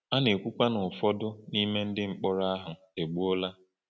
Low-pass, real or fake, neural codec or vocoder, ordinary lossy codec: none; real; none; none